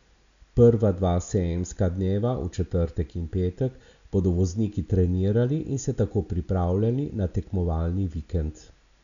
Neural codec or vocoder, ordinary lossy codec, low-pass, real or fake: none; none; 7.2 kHz; real